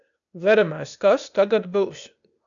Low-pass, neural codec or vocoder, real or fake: 7.2 kHz; codec, 16 kHz, 0.8 kbps, ZipCodec; fake